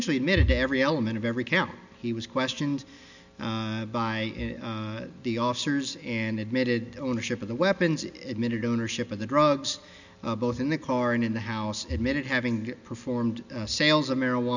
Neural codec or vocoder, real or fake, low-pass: none; real; 7.2 kHz